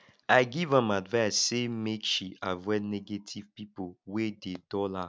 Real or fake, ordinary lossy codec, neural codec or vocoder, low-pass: real; none; none; none